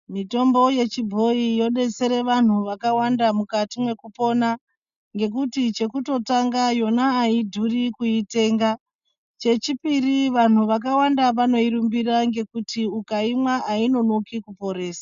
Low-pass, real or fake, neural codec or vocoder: 7.2 kHz; real; none